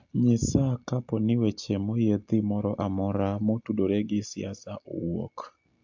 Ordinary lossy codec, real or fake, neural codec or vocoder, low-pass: AAC, 48 kbps; real; none; 7.2 kHz